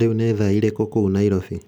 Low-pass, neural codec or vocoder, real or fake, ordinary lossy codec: none; none; real; none